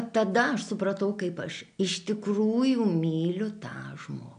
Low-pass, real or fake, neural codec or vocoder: 9.9 kHz; real; none